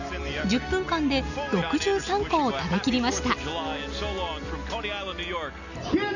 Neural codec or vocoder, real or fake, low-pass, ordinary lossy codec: none; real; 7.2 kHz; none